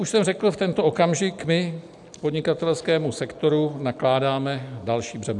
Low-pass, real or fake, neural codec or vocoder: 10.8 kHz; real; none